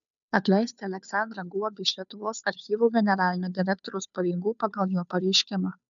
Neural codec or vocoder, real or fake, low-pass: codec, 16 kHz, 2 kbps, FunCodec, trained on Chinese and English, 25 frames a second; fake; 7.2 kHz